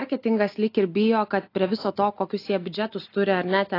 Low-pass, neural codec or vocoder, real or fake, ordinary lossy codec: 5.4 kHz; none; real; AAC, 32 kbps